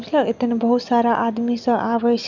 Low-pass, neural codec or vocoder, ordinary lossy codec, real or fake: 7.2 kHz; none; none; real